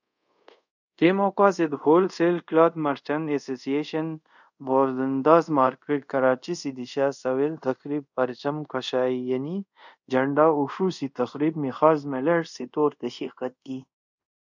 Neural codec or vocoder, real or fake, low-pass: codec, 24 kHz, 0.5 kbps, DualCodec; fake; 7.2 kHz